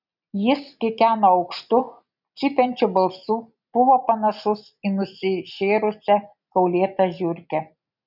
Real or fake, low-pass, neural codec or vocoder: real; 5.4 kHz; none